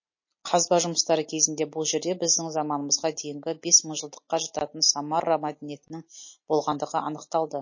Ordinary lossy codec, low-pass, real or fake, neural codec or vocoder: MP3, 32 kbps; 7.2 kHz; real; none